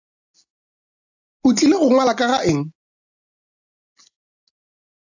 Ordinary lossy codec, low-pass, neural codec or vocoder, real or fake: AAC, 48 kbps; 7.2 kHz; none; real